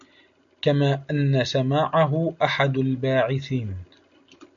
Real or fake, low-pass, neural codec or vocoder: real; 7.2 kHz; none